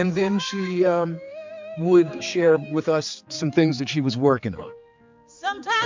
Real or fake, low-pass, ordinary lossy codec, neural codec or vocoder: fake; 7.2 kHz; MP3, 64 kbps; codec, 16 kHz, 4 kbps, X-Codec, HuBERT features, trained on general audio